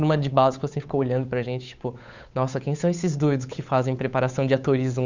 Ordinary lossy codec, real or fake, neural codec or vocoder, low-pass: Opus, 64 kbps; fake; codec, 16 kHz, 8 kbps, FunCodec, trained on Chinese and English, 25 frames a second; 7.2 kHz